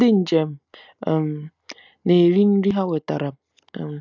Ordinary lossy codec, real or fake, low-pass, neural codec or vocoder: none; real; 7.2 kHz; none